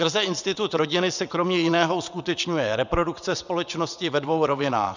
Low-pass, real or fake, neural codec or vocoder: 7.2 kHz; fake; vocoder, 22.05 kHz, 80 mel bands, WaveNeXt